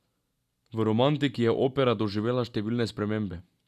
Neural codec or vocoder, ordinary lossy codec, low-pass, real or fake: none; none; 14.4 kHz; real